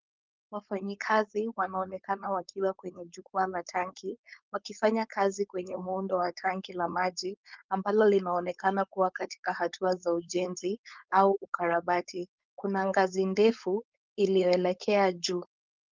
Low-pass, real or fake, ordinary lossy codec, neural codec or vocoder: 7.2 kHz; fake; Opus, 32 kbps; codec, 16 kHz, 4.8 kbps, FACodec